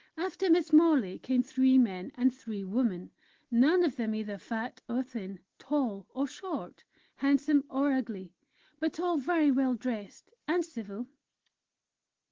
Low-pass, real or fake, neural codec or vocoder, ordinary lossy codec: 7.2 kHz; real; none; Opus, 16 kbps